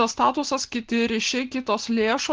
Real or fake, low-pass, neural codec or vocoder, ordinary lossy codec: real; 7.2 kHz; none; Opus, 16 kbps